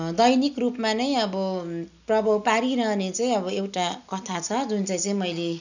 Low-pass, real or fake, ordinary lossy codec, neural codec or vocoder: 7.2 kHz; real; none; none